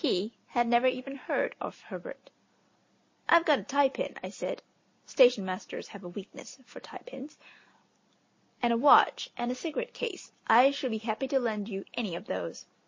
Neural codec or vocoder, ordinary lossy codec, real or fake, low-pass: none; MP3, 32 kbps; real; 7.2 kHz